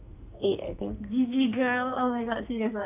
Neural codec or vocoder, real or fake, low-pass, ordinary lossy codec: codec, 44.1 kHz, 2.6 kbps, SNAC; fake; 3.6 kHz; none